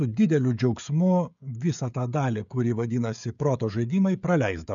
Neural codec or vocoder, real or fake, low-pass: codec, 16 kHz, 16 kbps, FreqCodec, smaller model; fake; 7.2 kHz